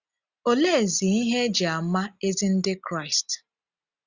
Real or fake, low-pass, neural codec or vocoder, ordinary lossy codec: real; 7.2 kHz; none; Opus, 64 kbps